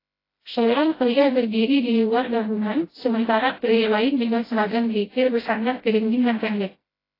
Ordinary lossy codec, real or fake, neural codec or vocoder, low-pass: AAC, 24 kbps; fake; codec, 16 kHz, 0.5 kbps, FreqCodec, smaller model; 5.4 kHz